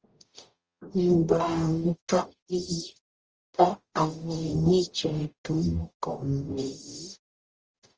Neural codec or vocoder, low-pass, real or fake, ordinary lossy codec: codec, 44.1 kHz, 0.9 kbps, DAC; 7.2 kHz; fake; Opus, 24 kbps